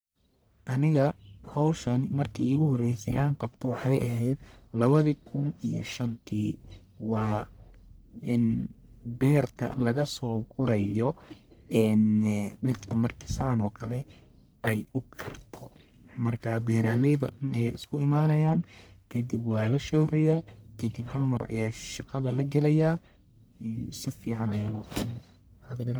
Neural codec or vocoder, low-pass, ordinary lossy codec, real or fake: codec, 44.1 kHz, 1.7 kbps, Pupu-Codec; none; none; fake